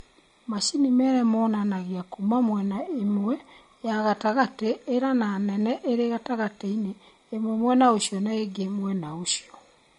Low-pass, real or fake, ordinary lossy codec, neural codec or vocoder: 10.8 kHz; real; MP3, 48 kbps; none